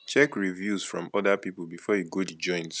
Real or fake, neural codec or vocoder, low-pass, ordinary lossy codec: real; none; none; none